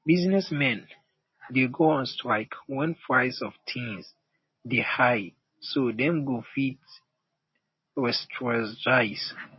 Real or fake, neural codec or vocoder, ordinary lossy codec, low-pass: real; none; MP3, 24 kbps; 7.2 kHz